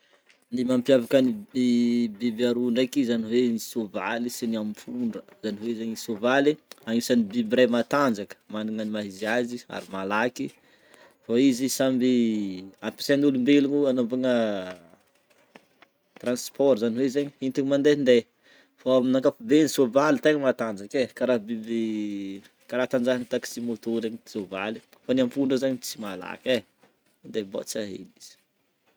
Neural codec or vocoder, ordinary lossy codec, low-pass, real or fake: none; none; none; real